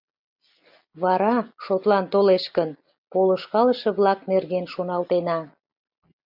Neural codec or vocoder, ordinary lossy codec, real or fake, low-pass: none; MP3, 48 kbps; real; 5.4 kHz